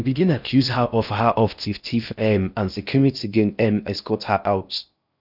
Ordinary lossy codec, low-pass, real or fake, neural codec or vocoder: none; 5.4 kHz; fake; codec, 16 kHz in and 24 kHz out, 0.6 kbps, FocalCodec, streaming, 4096 codes